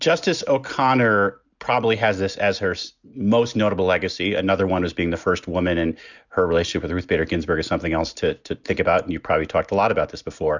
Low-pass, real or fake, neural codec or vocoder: 7.2 kHz; real; none